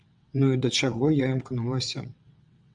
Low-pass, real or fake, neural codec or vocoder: 9.9 kHz; fake; vocoder, 22.05 kHz, 80 mel bands, WaveNeXt